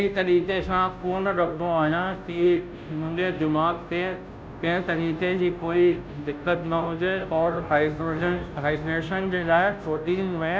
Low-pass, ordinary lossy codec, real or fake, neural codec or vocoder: none; none; fake; codec, 16 kHz, 0.5 kbps, FunCodec, trained on Chinese and English, 25 frames a second